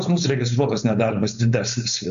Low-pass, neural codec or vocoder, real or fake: 7.2 kHz; codec, 16 kHz, 4.8 kbps, FACodec; fake